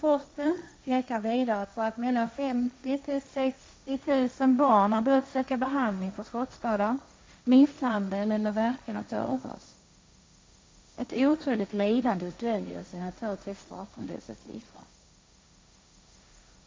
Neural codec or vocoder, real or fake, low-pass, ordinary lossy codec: codec, 16 kHz, 1.1 kbps, Voila-Tokenizer; fake; none; none